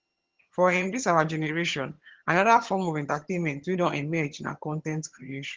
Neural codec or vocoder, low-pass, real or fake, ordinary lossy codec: vocoder, 22.05 kHz, 80 mel bands, HiFi-GAN; 7.2 kHz; fake; Opus, 16 kbps